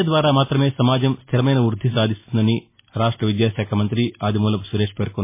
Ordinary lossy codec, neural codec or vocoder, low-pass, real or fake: MP3, 24 kbps; none; 3.6 kHz; real